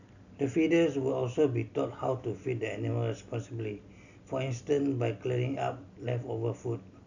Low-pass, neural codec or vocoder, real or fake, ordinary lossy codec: 7.2 kHz; none; real; none